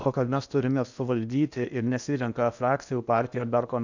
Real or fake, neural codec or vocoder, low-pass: fake; codec, 16 kHz in and 24 kHz out, 0.8 kbps, FocalCodec, streaming, 65536 codes; 7.2 kHz